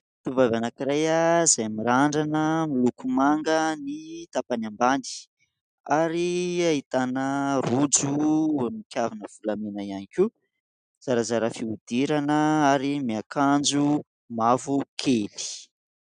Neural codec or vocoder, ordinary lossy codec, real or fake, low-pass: none; MP3, 96 kbps; real; 9.9 kHz